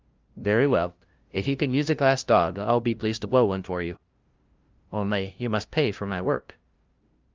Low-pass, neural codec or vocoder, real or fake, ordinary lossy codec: 7.2 kHz; codec, 16 kHz, 0.5 kbps, FunCodec, trained on LibriTTS, 25 frames a second; fake; Opus, 24 kbps